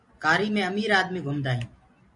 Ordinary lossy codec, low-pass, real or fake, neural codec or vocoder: MP3, 48 kbps; 10.8 kHz; real; none